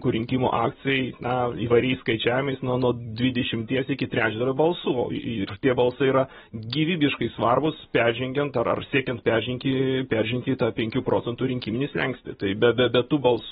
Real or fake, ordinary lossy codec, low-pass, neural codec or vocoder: real; AAC, 16 kbps; 19.8 kHz; none